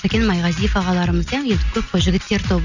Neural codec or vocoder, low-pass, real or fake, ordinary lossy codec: none; 7.2 kHz; real; none